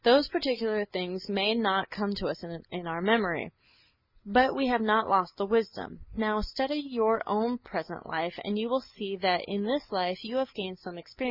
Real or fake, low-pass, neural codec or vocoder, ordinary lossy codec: real; 5.4 kHz; none; MP3, 48 kbps